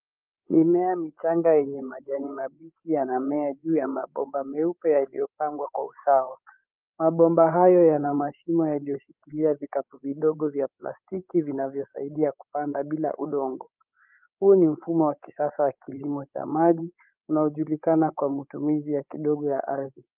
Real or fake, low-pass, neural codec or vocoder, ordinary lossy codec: fake; 3.6 kHz; codec, 16 kHz, 8 kbps, FreqCodec, larger model; Opus, 24 kbps